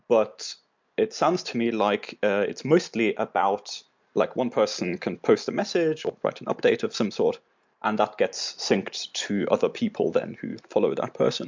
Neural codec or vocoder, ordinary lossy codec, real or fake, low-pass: none; MP3, 64 kbps; real; 7.2 kHz